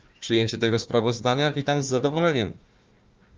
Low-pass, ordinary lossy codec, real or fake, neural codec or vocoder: 7.2 kHz; Opus, 24 kbps; fake; codec, 16 kHz, 1 kbps, FunCodec, trained on Chinese and English, 50 frames a second